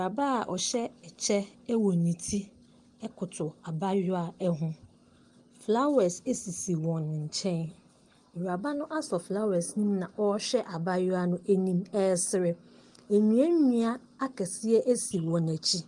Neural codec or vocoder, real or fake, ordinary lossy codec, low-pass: none; real; Opus, 24 kbps; 10.8 kHz